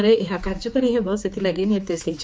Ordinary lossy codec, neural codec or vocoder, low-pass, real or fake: none; codec, 16 kHz, 4 kbps, X-Codec, HuBERT features, trained on general audio; none; fake